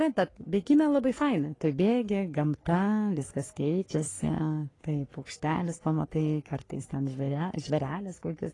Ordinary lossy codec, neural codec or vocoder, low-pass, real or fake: AAC, 32 kbps; codec, 44.1 kHz, 3.4 kbps, Pupu-Codec; 10.8 kHz; fake